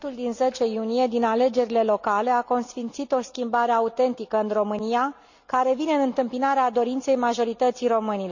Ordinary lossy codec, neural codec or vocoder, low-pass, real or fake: none; none; 7.2 kHz; real